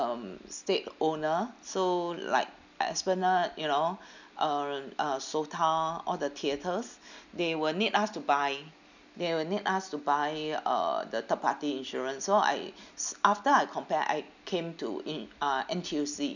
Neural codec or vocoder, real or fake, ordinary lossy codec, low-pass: none; real; none; 7.2 kHz